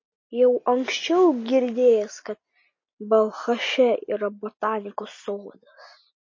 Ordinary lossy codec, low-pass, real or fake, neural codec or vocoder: MP3, 32 kbps; 7.2 kHz; real; none